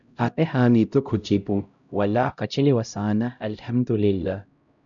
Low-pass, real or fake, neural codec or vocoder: 7.2 kHz; fake; codec, 16 kHz, 0.5 kbps, X-Codec, HuBERT features, trained on LibriSpeech